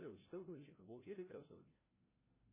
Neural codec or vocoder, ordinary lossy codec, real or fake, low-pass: codec, 16 kHz, 0.5 kbps, FreqCodec, larger model; MP3, 16 kbps; fake; 3.6 kHz